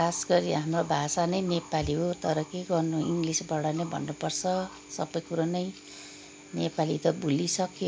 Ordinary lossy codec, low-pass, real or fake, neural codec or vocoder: none; none; real; none